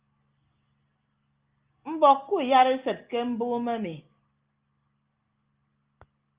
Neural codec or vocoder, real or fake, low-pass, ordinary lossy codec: none; real; 3.6 kHz; Opus, 24 kbps